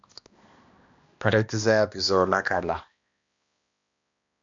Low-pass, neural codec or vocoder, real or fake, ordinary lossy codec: 7.2 kHz; codec, 16 kHz, 1 kbps, X-Codec, HuBERT features, trained on balanced general audio; fake; MP3, 64 kbps